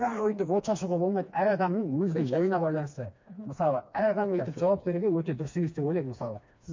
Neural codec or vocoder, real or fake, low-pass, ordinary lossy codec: codec, 16 kHz, 2 kbps, FreqCodec, smaller model; fake; 7.2 kHz; MP3, 48 kbps